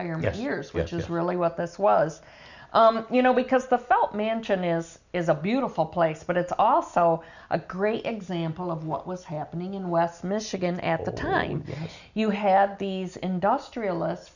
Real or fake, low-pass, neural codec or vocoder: real; 7.2 kHz; none